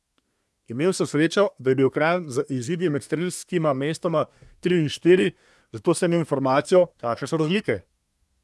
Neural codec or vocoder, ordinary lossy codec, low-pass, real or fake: codec, 24 kHz, 1 kbps, SNAC; none; none; fake